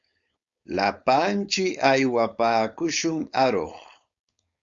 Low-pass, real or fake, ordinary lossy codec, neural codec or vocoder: 7.2 kHz; fake; Opus, 64 kbps; codec, 16 kHz, 4.8 kbps, FACodec